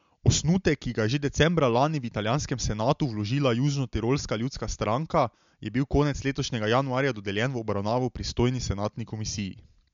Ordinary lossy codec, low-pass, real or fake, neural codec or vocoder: MP3, 64 kbps; 7.2 kHz; real; none